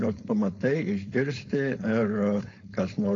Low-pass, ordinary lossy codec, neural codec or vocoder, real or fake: 7.2 kHz; AAC, 32 kbps; codec, 16 kHz, 4.8 kbps, FACodec; fake